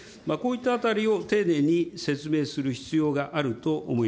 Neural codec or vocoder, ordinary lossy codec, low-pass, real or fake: none; none; none; real